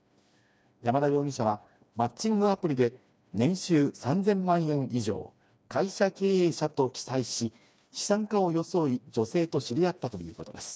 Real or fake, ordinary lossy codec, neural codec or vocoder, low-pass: fake; none; codec, 16 kHz, 2 kbps, FreqCodec, smaller model; none